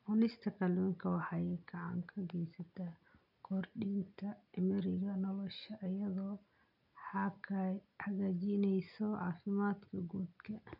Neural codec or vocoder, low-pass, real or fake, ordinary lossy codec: none; 5.4 kHz; real; none